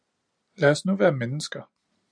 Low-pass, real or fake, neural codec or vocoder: 9.9 kHz; real; none